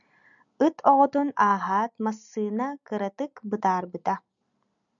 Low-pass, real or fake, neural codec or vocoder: 7.2 kHz; real; none